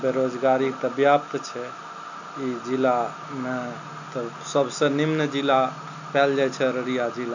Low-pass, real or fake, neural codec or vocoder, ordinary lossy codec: 7.2 kHz; real; none; none